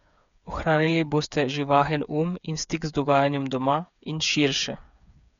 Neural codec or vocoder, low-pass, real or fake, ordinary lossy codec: codec, 16 kHz, 8 kbps, FreqCodec, smaller model; 7.2 kHz; fake; none